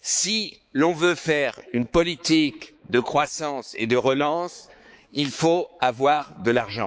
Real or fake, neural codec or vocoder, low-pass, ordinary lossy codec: fake; codec, 16 kHz, 4 kbps, X-Codec, HuBERT features, trained on balanced general audio; none; none